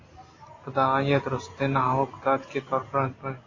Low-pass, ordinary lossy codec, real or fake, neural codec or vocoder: 7.2 kHz; AAC, 32 kbps; real; none